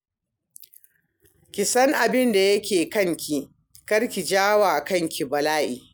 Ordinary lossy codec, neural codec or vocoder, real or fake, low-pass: none; none; real; none